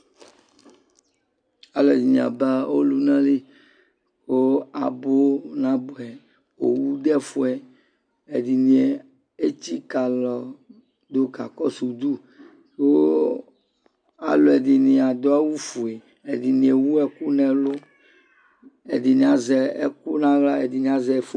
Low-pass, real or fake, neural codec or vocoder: 9.9 kHz; real; none